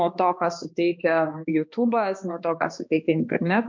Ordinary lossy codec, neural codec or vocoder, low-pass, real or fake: MP3, 64 kbps; codec, 16 kHz, 2 kbps, X-Codec, HuBERT features, trained on balanced general audio; 7.2 kHz; fake